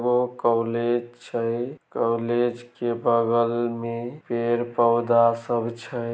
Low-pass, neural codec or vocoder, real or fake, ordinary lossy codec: none; none; real; none